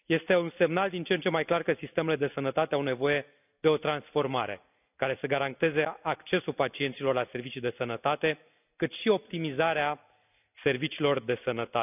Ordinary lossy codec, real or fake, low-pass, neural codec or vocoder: none; real; 3.6 kHz; none